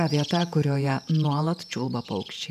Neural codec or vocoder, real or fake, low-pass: vocoder, 48 kHz, 128 mel bands, Vocos; fake; 14.4 kHz